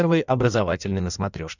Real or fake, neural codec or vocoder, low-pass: fake; codec, 16 kHz in and 24 kHz out, 1.1 kbps, FireRedTTS-2 codec; 7.2 kHz